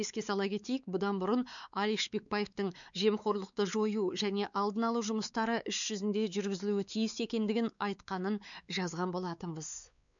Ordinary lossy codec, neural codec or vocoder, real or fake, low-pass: none; codec, 16 kHz, 4 kbps, X-Codec, WavLM features, trained on Multilingual LibriSpeech; fake; 7.2 kHz